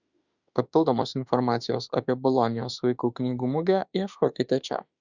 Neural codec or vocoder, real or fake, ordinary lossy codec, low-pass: autoencoder, 48 kHz, 32 numbers a frame, DAC-VAE, trained on Japanese speech; fake; Opus, 64 kbps; 7.2 kHz